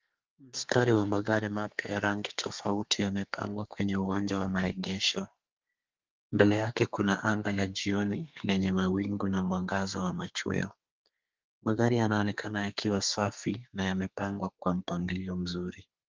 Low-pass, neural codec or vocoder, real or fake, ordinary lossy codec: 7.2 kHz; codec, 32 kHz, 1.9 kbps, SNAC; fake; Opus, 32 kbps